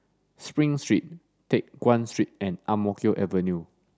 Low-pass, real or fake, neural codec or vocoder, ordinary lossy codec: none; real; none; none